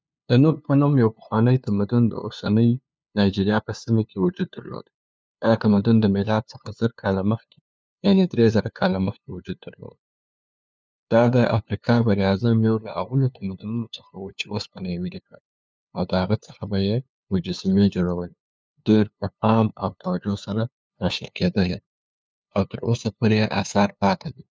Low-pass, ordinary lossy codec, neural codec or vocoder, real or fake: none; none; codec, 16 kHz, 2 kbps, FunCodec, trained on LibriTTS, 25 frames a second; fake